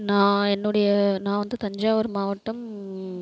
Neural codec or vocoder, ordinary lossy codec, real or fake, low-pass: none; none; real; none